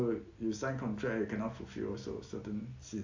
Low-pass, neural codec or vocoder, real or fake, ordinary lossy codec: 7.2 kHz; none; real; AAC, 48 kbps